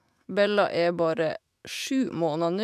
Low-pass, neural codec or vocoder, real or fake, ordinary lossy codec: 14.4 kHz; autoencoder, 48 kHz, 128 numbers a frame, DAC-VAE, trained on Japanese speech; fake; none